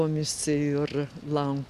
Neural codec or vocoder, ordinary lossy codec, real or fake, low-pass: none; Opus, 64 kbps; real; 14.4 kHz